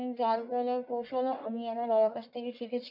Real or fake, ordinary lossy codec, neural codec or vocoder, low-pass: fake; MP3, 48 kbps; codec, 44.1 kHz, 1.7 kbps, Pupu-Codec; 5.4 kHz